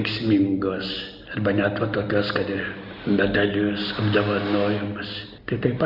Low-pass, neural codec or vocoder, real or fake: 5.4 kHz; none; real